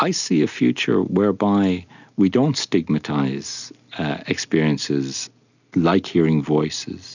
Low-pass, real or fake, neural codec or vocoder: 7.2 kHz; real; none